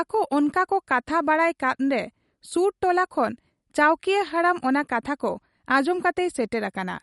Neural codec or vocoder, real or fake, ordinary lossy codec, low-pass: none; real; MP3, 48 kbps; 19.8 kHz